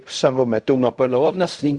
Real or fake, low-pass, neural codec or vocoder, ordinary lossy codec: fake; 10.8 kHz; codec, 16 kHz in and 24 kHz out, 0.4 kbps, LongCat-Audio-Codec, fine tuned four codebook decoder; Opus, 64 kbps